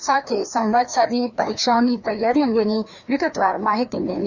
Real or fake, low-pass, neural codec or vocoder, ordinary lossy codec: fake; 7.2 kHz; codec, 16 kHz, 2 kbps, FreqCodec, larger model; none